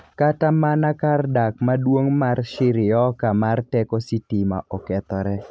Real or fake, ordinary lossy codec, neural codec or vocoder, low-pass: real; none; none; none